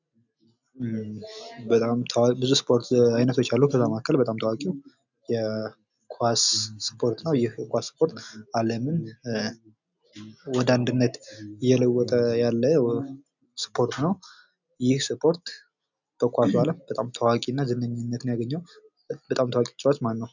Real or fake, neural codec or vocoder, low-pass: real; none; 7.2 kHz